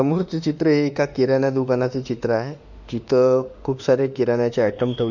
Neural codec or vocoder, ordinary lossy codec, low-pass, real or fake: autoencoder, 48 kHz, 32 numbers a frame, DAC-VAE, trained on Japanese speech; none; 7.2 kHz; fake